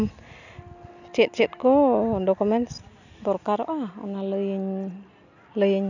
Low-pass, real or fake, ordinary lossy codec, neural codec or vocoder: 7.2 kHz; real; none; none